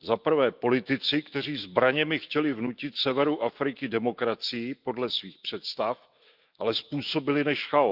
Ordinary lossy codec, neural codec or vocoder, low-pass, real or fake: Opus, 24 kbps; autoencoder, 48 kHz, 128 numbers a frame, DAC-VAE, trained on Japanese speech; 5.4 kHz; fake